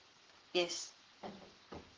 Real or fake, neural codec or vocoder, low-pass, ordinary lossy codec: fake; codec, 44.1 kHz, 7.8 kbps, Pupu-Codec; 7.2 kHz; Opus, 16 kbps